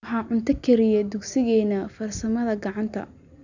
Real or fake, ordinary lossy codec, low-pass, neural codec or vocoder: real; none; 7.2 kHz; none